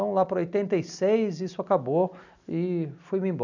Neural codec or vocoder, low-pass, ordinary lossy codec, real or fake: none; 7.2 kHz; none; real